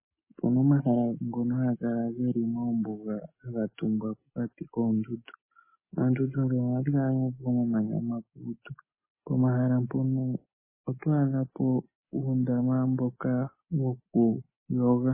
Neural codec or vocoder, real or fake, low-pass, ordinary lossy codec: none; real; 3.6 kHz; MP3, 16 kbps